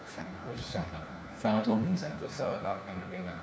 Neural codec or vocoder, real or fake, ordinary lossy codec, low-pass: codec, 16 kHz, 1 kbps, FunCodec, trained on LibriTTS, 50 frames a second; fake; none; none